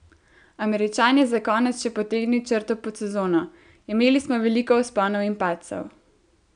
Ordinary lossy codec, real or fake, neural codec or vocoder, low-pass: none; real; none; 9.9 kHz